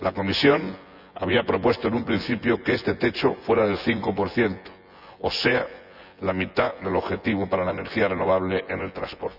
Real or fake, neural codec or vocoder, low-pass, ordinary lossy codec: fake; vocoder, 24 kHz, 100 mel bands, Vocos; 5.4 kHz; none